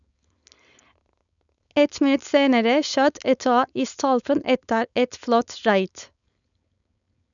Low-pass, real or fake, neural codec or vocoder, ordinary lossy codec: 7.2 kHz; fake; codec, 16 kHz, 4.8 kbps, FACodec; none